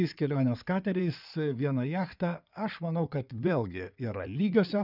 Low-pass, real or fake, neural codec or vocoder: 5.4 kHz; fake; codec, 16 kHz in and 24 kHz out, 2.2 kbps, FireRedTTS-2 codec